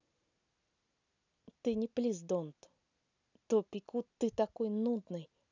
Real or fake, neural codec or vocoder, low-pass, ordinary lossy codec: real; none; 7.2 kHz; none